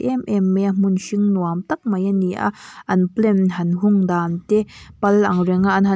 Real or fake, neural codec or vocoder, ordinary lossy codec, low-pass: real; none; none; none